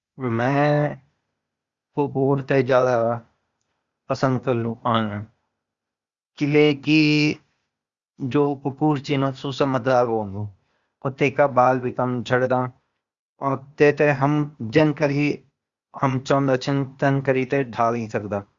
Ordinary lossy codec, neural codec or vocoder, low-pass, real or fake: Opus, 64 kbps; codec, 16 kHz, 0.8 kbps, ZipCodec; 7.2 kHz; fake